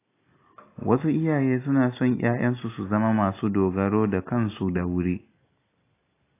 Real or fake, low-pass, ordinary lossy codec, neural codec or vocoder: real; 3.6 kHz; AAC, 24 kbps; none